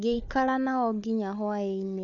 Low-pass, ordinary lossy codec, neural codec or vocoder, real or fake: 7.2 kHz; none; codec, 16 kHz, 4 kbps, FunCodec, trained on Chinese and English, 50 frames a second; fake